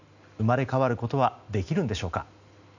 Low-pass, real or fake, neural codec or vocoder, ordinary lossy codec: 7.2 kHz; real; none; none